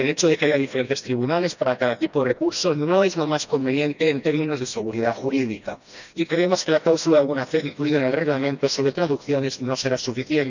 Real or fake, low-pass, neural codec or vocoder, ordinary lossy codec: fake; 7.2 kHz; codec, 16 kHz, 1 kbps, FreqCodec, smaller model; none